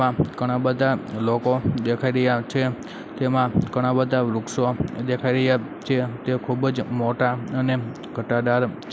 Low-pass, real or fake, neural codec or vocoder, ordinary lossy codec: none; real; none; none